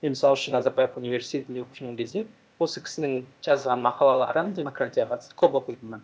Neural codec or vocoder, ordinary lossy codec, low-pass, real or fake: codec, 16 kHz, 0.8 kbps, ZipCodec; none; none; fake